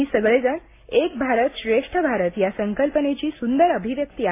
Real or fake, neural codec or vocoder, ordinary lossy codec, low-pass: real; none; AAC, 24 kbps; 3.6 kHz